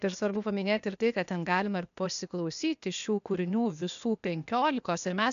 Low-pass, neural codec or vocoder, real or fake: 7.2 kHz; codec, 16 kHz, 0.8 kbps, ZipCodec; fake